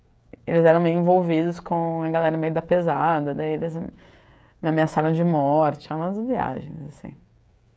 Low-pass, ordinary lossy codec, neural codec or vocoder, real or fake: none; none; codec, 16 kHz, 16 kbps, FreqCodec, smaller model; fake